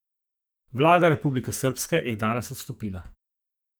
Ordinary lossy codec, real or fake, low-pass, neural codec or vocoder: none; fake; none; codec, 44.1 kHz, 2.6 kbps, SNAC